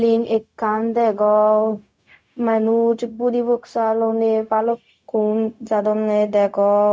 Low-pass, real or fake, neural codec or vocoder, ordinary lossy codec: none; fake; codec, 16 kHz, 0.4 kbps, LongCat-Audio-Codec; none